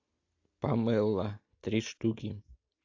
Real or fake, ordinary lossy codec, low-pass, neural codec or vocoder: real; AAC, 48 kbps; 7.2 kHz; none